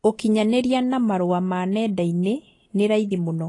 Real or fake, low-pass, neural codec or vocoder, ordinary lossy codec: real; 10.8 kHz; none; AAC, 32 kbps